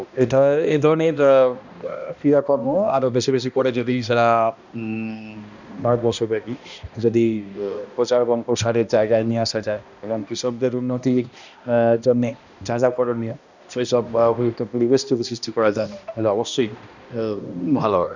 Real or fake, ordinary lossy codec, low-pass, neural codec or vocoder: fake; none; 7.2 kHz; codec, 16 kHz, 1 kbps, X-Codec, HuBERT features, trained on balanced general audio